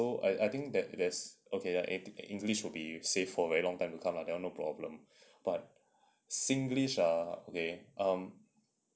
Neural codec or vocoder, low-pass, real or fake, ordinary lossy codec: none; none; real; none